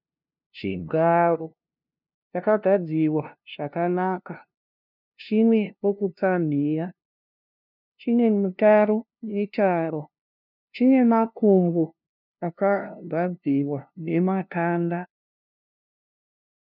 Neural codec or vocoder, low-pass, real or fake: codec, 16 kHz, 0.5 kbps, FunCodec, trained on LibriTTS, 25 frames a second; 5.4 kHz; fake